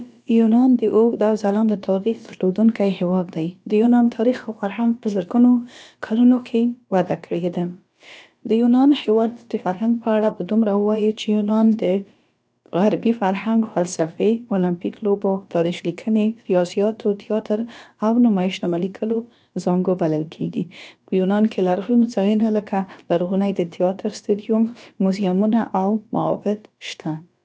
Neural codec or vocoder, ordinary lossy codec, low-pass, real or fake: codec, 16 kHz, about 1 kbps, DyCAST, with the encoder's durations; none; none; fake